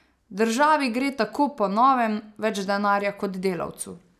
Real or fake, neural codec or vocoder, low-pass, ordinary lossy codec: real; none; 14.4 kHz; none